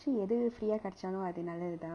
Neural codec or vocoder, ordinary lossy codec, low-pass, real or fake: none; none; 9.9 kHz; real